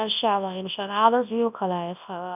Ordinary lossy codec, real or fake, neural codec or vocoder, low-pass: none; fake; codec, 24 kHz, 0.9 kbps, WavTokenizer, large speech release; 3.6 kHz